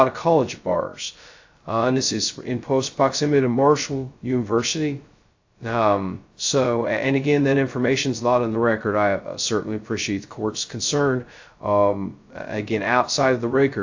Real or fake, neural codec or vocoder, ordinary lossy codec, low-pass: fake; codec, 16 kHz, 0.2 kbps, FocalCodec; AAC, 48 kbps; 7.2 kHz